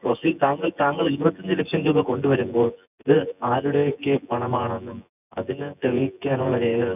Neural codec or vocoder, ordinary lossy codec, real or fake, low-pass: vocoder, 24 kHz, 100 mel bands, Vocos; none; fake; 3.6 kHz